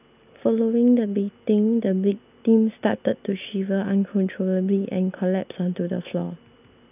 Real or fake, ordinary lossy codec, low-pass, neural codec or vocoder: real; none; 3.6 kHz; none